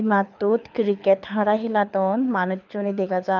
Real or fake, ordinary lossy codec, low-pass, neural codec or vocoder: fake; none; 7.2 kHz; codec, 24 kHz, 6 kbps, HILCodec